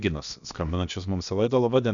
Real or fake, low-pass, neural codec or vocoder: fake; 7.2 kHz; codec, 16 kHz, about 1 kbps, DyCAST, with the encoder's durations